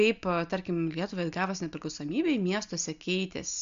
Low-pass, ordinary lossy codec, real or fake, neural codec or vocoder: 7.2 kHz; MP3, 64 kbps; real; none